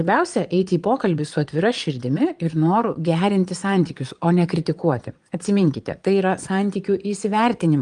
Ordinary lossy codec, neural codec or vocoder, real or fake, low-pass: Opus, 32 kbps; vocoder, 22.05 kHz, 80 mel bands, Vocos; fake; 9.9 kHz